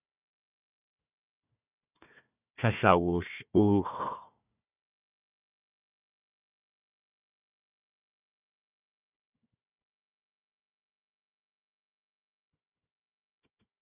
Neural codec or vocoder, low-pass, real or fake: codec, 16 kHz, 1 kbps, FunCodec, trained on Chinese and English, 50 frames a second; 3.6 kHz; fake